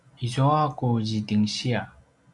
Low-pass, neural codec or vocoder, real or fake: 10.8 kHz; none; real